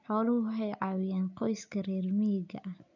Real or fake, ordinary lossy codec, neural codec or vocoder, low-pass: real; none; none; 7.2 kHz